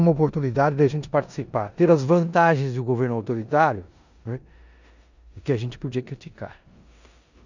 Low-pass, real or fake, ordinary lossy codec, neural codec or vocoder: 7.2 kHz; fake; none; codec, 16 kHz in and 24 kHz out, 0.9 kbps, LongCat-Audio-Codec, four codebook decoder